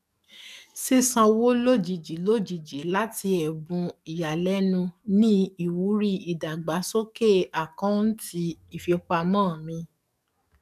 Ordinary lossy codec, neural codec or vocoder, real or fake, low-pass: none; codec, 44.1 kHz, 7.8 kbps, DAC; fake; 14.4 kHz